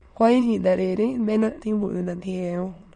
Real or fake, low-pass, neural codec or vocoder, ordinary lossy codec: fake; 9.9 kHz; autoencoder, 22.05 kHz, a latent of 192 numbers a frame, VITS, trained on many speakers; MP3, 48 kbps